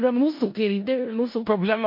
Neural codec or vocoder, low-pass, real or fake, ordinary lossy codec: codec, 16 kHz in and 24 kHz out, 0.4 kbps, LongCat-Audio-Codec, four codebook decoder; 5.4 kHz; fake; MP3, 32 kbps